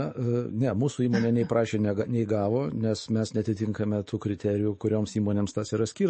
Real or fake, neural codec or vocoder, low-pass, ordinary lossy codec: fake; autoencoder, 48 kHz, 128 numbers a frame, DAC-VAE, trained on Japanese speech; 10.8 kHz; MP3, 32 kbps